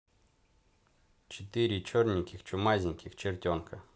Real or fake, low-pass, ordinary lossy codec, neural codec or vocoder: real; none; none; none